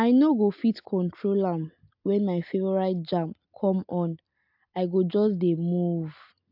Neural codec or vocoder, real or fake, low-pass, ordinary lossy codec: none; real; 5.4 kHz; AAC, 48 kbps